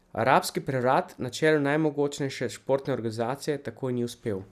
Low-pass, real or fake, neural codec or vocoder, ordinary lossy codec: 14.4 kHz; real; none; none